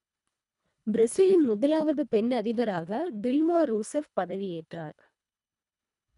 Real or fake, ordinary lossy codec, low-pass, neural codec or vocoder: fake; none; 10.8 kHz; codec, 24 kHz, 1.5 kbps, HILCodec